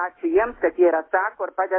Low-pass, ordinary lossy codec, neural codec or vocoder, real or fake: 7.2 kHz; AAC, 16 kbps; autoencoder, 48 kHz, 128 numbers a frame, DAC-VAE, trained on Japanese speech; fake